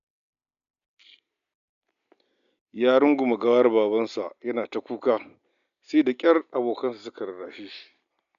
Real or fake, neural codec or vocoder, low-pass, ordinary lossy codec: real; none; 7.2 kHz; none